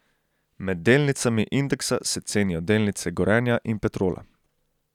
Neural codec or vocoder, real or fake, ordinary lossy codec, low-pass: none; real; none; 19.8 kHz